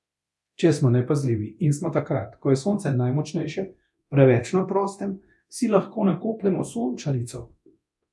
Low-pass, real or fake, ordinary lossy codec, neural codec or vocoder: none; fake; none; codec, 24 kHz, 0.9 kbps, DualCodec